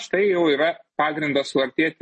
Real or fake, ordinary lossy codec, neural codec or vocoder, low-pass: real; MP3, 32 kbps; none; 10.8 kHz